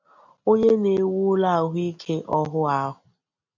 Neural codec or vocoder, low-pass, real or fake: none; 7.2 kHz; real